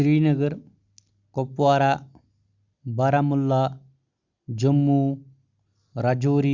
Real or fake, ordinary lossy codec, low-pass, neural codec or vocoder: real; Opus, 64 kbps; 7.2 kHz; none